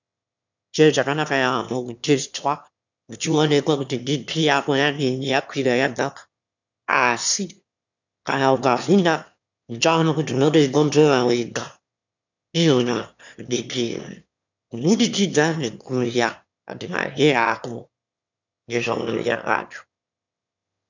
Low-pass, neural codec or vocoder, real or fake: 7.2 kHz; autoencoder, 22.05 kHz, a latent of 192 numbers a frame, VITS, trained on one speaker; fake